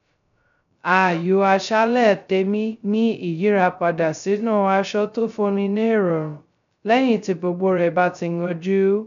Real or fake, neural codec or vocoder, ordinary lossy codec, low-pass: fake; codec, 16 kHz, 0.2 kbps, FocalCodec; none; 7.2 kHz